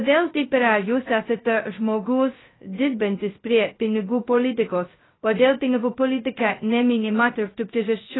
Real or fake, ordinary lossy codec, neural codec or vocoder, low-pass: fake; AAC, 16 kbps; codec, 16 kHz, 0.2 kbps, FocalCodec; 7.2 kHz